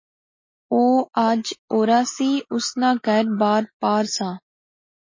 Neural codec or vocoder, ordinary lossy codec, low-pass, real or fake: none; MP3, 32 kbps; 7.2 kHz; real